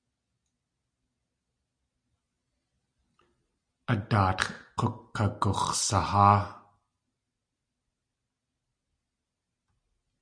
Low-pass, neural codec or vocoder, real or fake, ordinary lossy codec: 9.9 kHz; none; real; Opus, 64 kbps